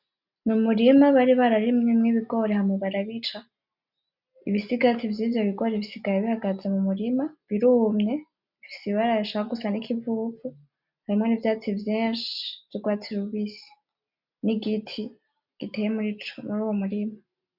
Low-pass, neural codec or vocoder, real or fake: 5.4 kHz; none; real